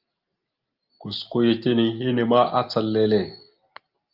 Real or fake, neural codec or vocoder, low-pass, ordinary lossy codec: real; none; 5.4 kHz; Opus, 24 kbps